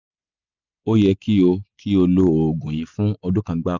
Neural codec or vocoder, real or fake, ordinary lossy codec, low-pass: none; real; none; 7.2 kHz